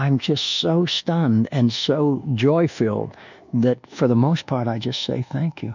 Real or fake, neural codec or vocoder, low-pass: fake; codec, 24 kHz, 1.2 kbps, DualCodec; 7.2 kHz